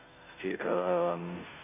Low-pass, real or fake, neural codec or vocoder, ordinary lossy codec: 3.6 kHz; fake; codec, 16 kHz, 0.5 kbps, FunCodec, trained on Chinese and English, 25 frames a second; none